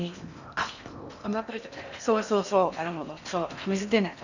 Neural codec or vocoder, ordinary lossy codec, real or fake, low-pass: codec, 16 kHz in and 24 kHz out, 0.8 kbps, FocalCodec, streaming, 65536 codes; none; fake; 7.2 kHz